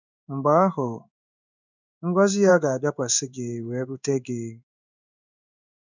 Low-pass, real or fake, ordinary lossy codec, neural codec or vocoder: 7.2 kHz; fake; none; codec, 16 kHz in and 24 kHz out, 1 kbps, XY-Tokenizer